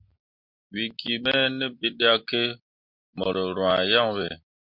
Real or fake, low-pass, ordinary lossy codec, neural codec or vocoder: real; 5.4 kHz; MP3, 48 kbps; none